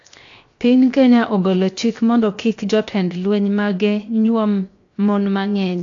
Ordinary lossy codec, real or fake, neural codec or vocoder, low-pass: AAC, 48 kbps; fake; codec, 16 kHz, 0.7 kbps, FocalCodec; 7.2 kHz